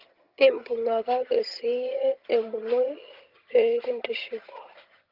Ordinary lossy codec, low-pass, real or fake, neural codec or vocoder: Opus, 24 kbps; 5.4 kHz; fake; vocoder, 22.05 kHz, 80 mel bands, HiFi-GAN